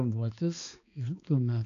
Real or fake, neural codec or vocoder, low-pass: fake; codec, 16 kHz, 2 kbps, X-Codec, HuBERT features, trained on balanced general audio; 7.2 kHz